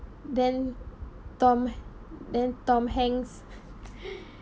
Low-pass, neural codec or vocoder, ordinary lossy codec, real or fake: none; none; none; real